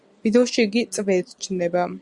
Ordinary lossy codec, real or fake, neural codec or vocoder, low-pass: Opus, 64 kbps; real; none; 10.8 kHz